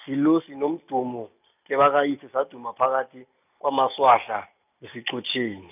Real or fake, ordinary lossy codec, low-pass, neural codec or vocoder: real; none; 3.6 kHz; none